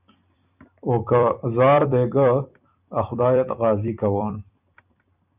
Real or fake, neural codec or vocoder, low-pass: fake; vocoder, 44.1 kHz, 128 mel bands every 256 samples, BigVGAN v2; 3.6 kHz